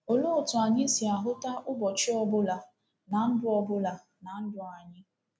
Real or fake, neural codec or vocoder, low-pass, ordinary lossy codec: real; none; none; none